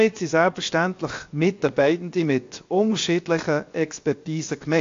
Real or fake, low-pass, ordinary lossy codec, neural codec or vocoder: fake; 7.2 kHz; AAC, 48 kbps; codec, 16 kHz, 0.7 kbps, FocalCodec